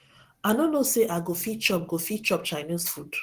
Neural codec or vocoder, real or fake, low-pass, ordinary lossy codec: none; real; 14.4 kHz; Opus, 16 kbps